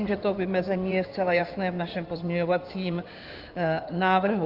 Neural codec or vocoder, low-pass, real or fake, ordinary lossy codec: codec, 16 kHz in and 24 kHz out, 2.2 kbps, FireRedTTS-2 codec; 5.4 kHz; fake; Opus, 24 kbps